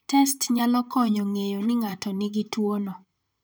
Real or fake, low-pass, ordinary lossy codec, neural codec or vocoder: fake; none; none; vocoder, 44.1 kHz, 128 mel bands, Pupu-Vocoder